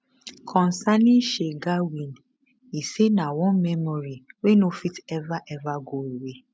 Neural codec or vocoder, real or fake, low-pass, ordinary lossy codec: none; real; none; none